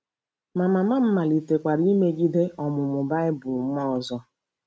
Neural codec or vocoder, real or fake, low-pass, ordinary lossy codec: none; real; none; none